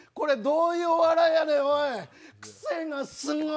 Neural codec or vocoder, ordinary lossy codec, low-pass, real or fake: none; none; none; real